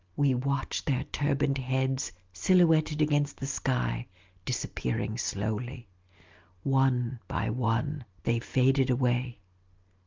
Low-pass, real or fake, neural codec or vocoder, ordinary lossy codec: 7.2 kHz; real; none; Opus, 32 kbps